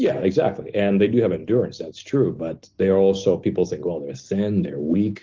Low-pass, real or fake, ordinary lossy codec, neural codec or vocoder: 7.2 kHz; real; Opus, 16 kbps; none